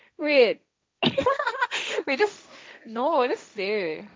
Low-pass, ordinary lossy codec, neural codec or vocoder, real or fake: none; none; codec, 16 kHz, 1.1 kbps, Voila-Tokenizer; fake